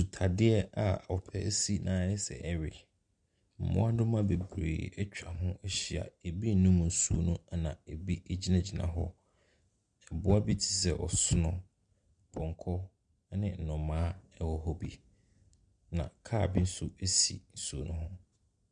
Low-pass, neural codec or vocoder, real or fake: 9.9 kHz; none; real